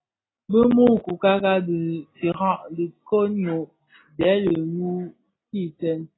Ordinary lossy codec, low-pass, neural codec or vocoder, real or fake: AAC, 16 kbps; 7.2 kHz; none; real